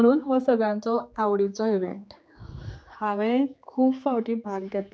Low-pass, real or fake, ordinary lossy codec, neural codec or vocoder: none; fake; none; codec, 16 kHz, 4 kbps, X-Codec, HuBERT features, trained on general audio